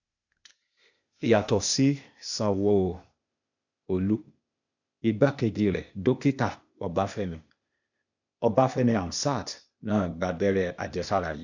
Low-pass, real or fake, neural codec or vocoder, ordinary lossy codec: 7.2 kHz; fake; codec, 16 kHz, 0.8 kbps, ZipCodec; none